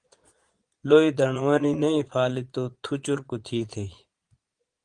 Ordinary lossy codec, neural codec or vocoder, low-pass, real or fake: Opus, 24 kbps; vocoder, 22.05 kHz, 80 mel bands, Vocos; 9.9 kHz; fake